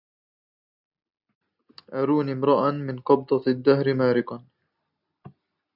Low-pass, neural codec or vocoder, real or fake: 5.4 kHz; none; real